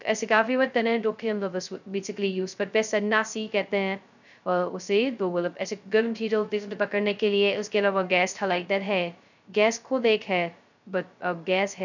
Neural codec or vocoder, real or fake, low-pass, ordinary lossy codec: codec, 16 kHz, 0.2 kbps, FocalCodec; fake; 7.2 kHz; none